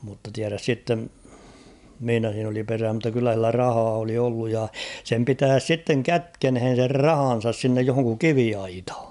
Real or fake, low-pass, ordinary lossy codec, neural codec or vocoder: real; 10.8 kHz; none; none